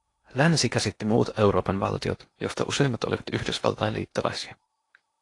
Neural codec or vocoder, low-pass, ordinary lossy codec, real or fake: codec, 16 kHz in and 24 kHz out, 0.8 kbps, FocalCodec, streaming, 65536 codes; 10.8 kHz; AAC, 48 kbps; fake